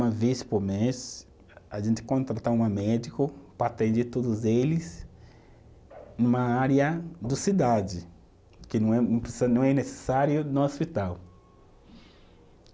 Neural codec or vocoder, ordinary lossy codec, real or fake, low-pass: none; none; real; none